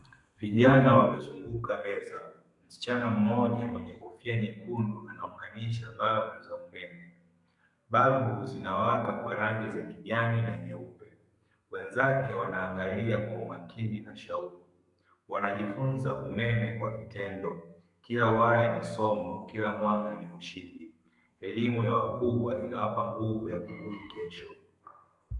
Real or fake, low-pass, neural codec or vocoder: fake; 10.8 kHz; codec, 32 kHz, 1.9 kbps, SNAC